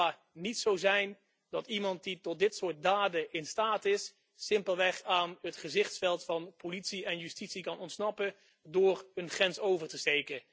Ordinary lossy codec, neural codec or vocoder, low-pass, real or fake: none; none; none; real